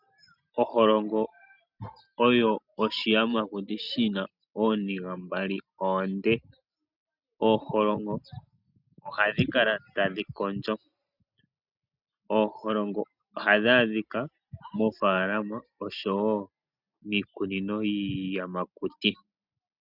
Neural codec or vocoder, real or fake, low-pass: none; real; 5.4 kHz